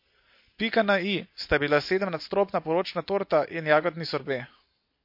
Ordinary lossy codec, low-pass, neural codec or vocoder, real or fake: MP3, 32 kbps; 5.4 kHz; none; real